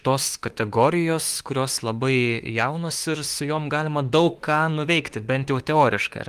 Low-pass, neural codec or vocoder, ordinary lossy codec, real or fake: 14.4 kHz; autoencoder, 48 kHz, 32 numbers a frame, DAC-VAE, trained on Japanese speech; Opus, 24 kbps; fake